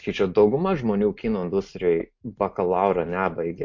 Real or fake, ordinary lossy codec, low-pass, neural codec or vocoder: real; MP3, 48 kbps; 7.2 kHz; none